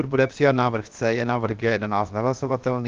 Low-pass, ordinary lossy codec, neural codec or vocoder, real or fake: 7.2 kHz; Opus, 32 kbps; codec, 16 kHz, 0.7 kbps, FocalCodec; fake